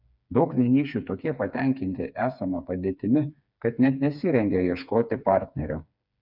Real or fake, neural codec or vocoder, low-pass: fake; codec, 16 kHz, 4 kbps, FreqCodec, smaller model; 5.4 kHz